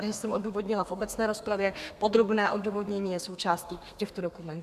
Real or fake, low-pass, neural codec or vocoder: fake; 14.4 kHz; codec, 32 kHz, 1.9 kbps, SNAC